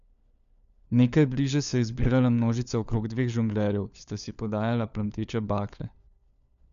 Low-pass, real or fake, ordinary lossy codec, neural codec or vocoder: 7.2 kHz; fake; none; codec, 16 kHz, 4 kbps, FunCodec, trained on LibriTTS, 50 frames a second